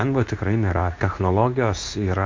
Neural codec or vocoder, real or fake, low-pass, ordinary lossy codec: codec, 24 kHz, 0.9 kbps, WavTokenizer, medium speech release version 2; fake; 7.2 kHz; MP3, 48 kbps